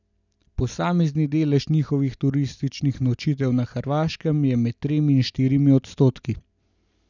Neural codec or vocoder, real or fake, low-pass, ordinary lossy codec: none; real; 7.2 kHz; none